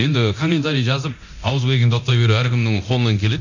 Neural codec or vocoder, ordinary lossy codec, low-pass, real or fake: codec, 24 kHz, 0.9 kbps, DualCodec; none; 7.2 kHz; fake